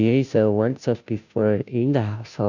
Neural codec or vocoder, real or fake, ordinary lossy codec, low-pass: codec, 16 kHz, 0.5 kbps, FunCodec, trained on Chinese and English, 25 frames a second; fake; none; 7.2 kHz